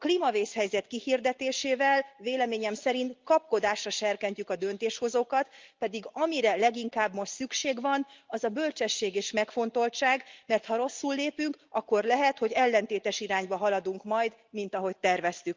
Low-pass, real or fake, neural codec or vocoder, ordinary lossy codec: 7.2 kHz; real; none; Opus, 24 kbps